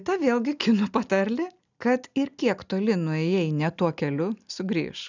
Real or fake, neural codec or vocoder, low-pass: real; none; 7.2 kHz